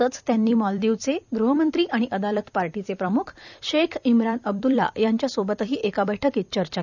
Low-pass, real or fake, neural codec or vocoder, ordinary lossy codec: 7.2 kHz; fake; vocoder, 44.1 kHz, 128 mel bands every 256 samples, BigVGAN v2; none